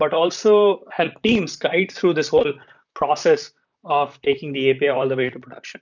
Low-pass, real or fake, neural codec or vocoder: 7.2 kHz; fake; vocoder, 44.1 kHz, 128 mel bands, Pupu-Vocoder